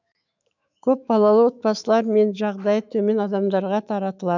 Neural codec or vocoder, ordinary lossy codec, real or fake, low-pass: autoencoder, 48 kHz, 128 numbers a frame, DAC-VAE, trained on Japanese speech; none; fake; 7.2 kHz